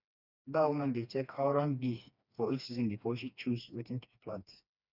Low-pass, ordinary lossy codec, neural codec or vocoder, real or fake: 5.4 kHz; none; codec, 16 kHz, 2 kbps, FreqCodec, smaller model; fake